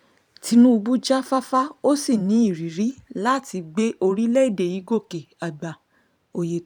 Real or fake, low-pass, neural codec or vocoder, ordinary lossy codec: fake; 19.8 kHz; vocoder, 44.1 kHz, 128 mel bands every 512 samples, BigVGAN v2; none